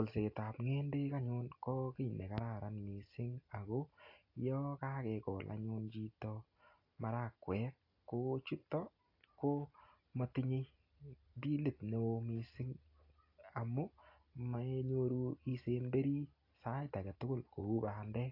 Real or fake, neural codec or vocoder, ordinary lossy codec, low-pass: real; none; AAC, 32 kbps; 5.4 kHz